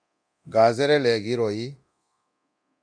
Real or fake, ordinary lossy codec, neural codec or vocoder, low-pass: fake; MP3, 96 kbps; codec, 24 kHz, 0.9 kbps, DualCodec; 9.9 kHz